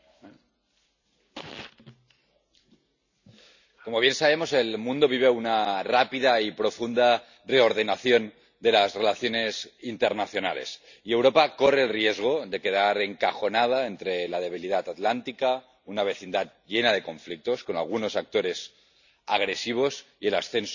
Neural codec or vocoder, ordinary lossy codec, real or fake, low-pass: none; none; real; 7.2 kHz